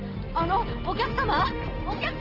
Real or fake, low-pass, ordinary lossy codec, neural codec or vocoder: real; 5.4 kHz; Opus, 16 kbps; none